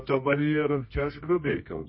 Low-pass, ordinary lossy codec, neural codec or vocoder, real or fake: 7.2 kHz; MP3, 24 kbps; codec, 24 kHz, 0.9 kbps, WavTokenizer, medium music audio release; fake